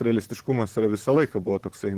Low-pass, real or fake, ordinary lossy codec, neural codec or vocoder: 14.4 kHz; fake; Opus, 16 kbps; vocoder, 44.1 kHz, 128 mel bands, Pupu-Vocoder